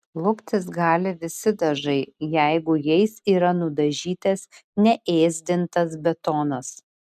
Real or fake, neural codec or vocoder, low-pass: real; none; 14.4 kHz